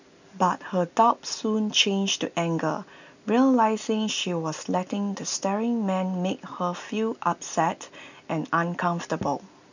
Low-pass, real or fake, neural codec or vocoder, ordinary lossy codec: 7.2 kHz; real; none; none